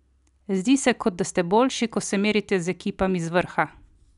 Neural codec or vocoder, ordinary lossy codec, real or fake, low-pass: none; none; real; 10.8 kHz